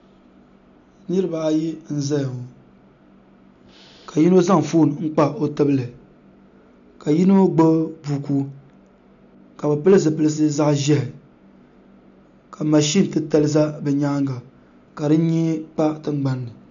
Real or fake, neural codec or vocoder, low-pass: real; none; 7.2 kHz